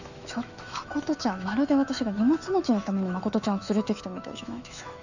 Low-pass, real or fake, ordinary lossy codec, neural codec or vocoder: 7.2 kHz; fake; none; autoencoder, 48 kHz, 128 numbers a frame, DAC-VAE, trained on Japanese speech